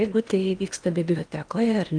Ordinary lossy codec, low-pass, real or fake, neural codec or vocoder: Opus, 32 kbps; 9.9 kHz; fake; codec, 16 kHz in and 24 kHz out, 0.8 kbps, FocalCodec, streaming, 65536 codes